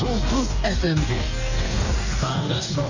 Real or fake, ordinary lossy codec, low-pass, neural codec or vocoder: fake; none; 7.2 kHz; codec, 44.1 kHz, 2.6 kbps, DAC